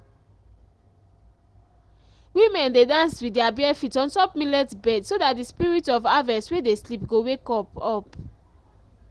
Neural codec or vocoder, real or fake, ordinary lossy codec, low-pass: none; real; Opus, 16 kbps; 10.8 kHz